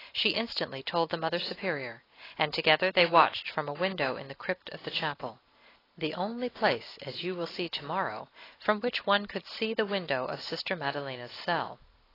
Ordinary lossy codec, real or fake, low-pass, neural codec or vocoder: AAC, 24 kbps; real; 5.4 kHz; none